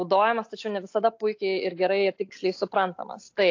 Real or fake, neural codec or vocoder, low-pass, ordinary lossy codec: real; none; 7.2 kHz; AAC, 48 kbps